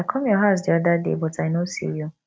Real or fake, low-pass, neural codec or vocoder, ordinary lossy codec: real; none; none; none